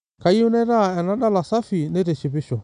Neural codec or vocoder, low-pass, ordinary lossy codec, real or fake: none; 9.9 kHz; none; real